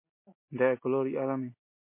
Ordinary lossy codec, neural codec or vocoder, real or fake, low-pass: MP3, 24 kbps; none; real; 3.6 kHz